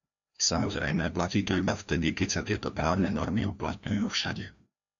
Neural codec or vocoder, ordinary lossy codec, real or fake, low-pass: codec, 16 kHz, 1 kbps, FreqCodec, larger model; MP3, 96 kbps; fake; 7.2 kHz